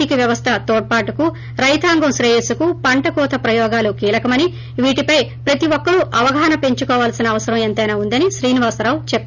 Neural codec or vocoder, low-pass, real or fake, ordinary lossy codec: none; 7.2 kHz; real; none